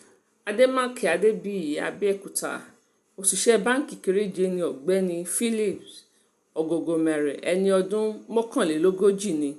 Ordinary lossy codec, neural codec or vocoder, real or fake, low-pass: none; none; real; 14.4 kHz